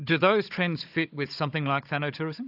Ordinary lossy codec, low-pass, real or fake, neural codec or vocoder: AAC, 48 kbps; 5.4 kHz; fake; codec, 16 kHz, 16 kbps, FunCodec, trained on Chinese and English, 50 frames a second